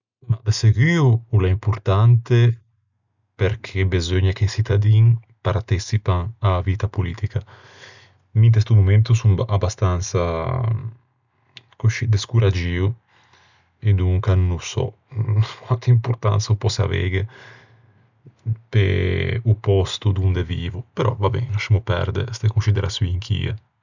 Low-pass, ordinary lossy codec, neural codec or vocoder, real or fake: 7.2 kHz; none; none; real